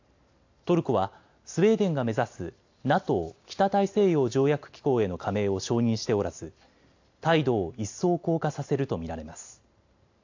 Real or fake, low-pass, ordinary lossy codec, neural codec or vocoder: real; 7.2 kHz; AAC, 48 kbps; none